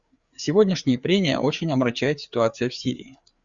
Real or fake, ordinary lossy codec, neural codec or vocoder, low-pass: fake; Opus, 64 kbps; codec, 16 kHz, 4 kbps, FreqCodec, larger model; 7.2 kHz